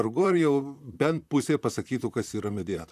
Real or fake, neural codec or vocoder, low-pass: fake; vocoder, 44.1 kHz, 128 mel bands, Pupu-Vocoder; 14.4 kHz